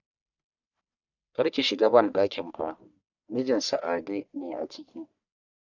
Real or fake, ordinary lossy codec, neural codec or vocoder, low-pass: fake; none; codec, 44.1 kHz, 1.7 kbps, Pupu-Codec; 7.2 kHz